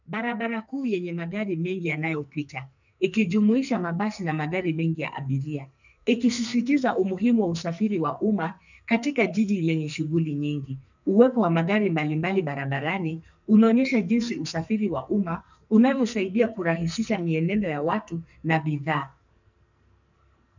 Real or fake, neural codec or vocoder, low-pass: fake; codec, 44.1 kHz, 2.6 kbps, SNAC; 7.2 kHz